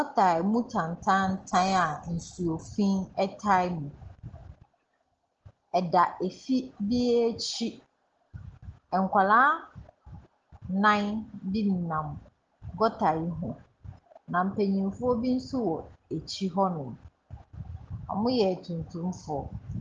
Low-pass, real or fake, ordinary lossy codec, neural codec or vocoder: 7.2 kHz; real; Opus, 16 kbps; none